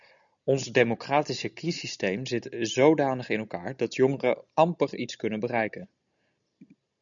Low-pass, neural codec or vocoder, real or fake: 7.2 kHz; none; real